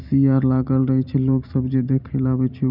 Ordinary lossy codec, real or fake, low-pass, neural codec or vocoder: none; fake; 5.4 kHz; vocoder, 44.1 kHz, 128 mel bands every 512 samples, BigVGAN v2